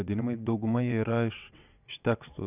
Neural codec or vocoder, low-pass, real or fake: vocoder, 22.05 kHz, 80 mel bands, WaveNeXt; 3.6 kHz; fake